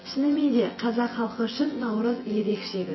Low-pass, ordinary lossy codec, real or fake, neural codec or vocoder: 7.2 kHz; MP3, 24 kbps; fake; vocoder, 24 kHz, 100 mel bands, Vocos